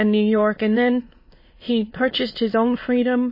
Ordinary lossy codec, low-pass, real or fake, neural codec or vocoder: MP3, 24 kbps; 5.4 kHz; fake; autoencoder, 22.05 kHz, a latent of 192 numbers a frame, VITS, trained on many speakers